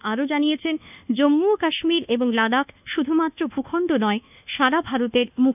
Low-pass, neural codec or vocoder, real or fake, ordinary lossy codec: 3.6 kHz; codec, 24 kHz, 1.2 kbps, DualCodec; fake; none